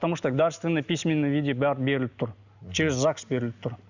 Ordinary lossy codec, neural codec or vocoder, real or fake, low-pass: none; none; real; 7.2 kHz